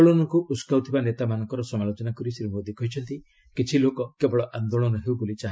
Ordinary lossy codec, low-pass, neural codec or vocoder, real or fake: none; none; none; real